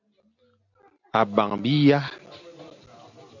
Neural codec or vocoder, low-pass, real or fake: none; 7.2 kHz; real